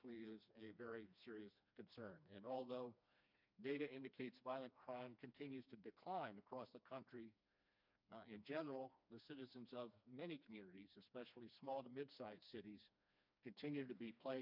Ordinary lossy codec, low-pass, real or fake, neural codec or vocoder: MP3, 48 kbps; 5.4 kHz; fake; codec, 16 kHz, 2 kbps, FreqCodec, smaller model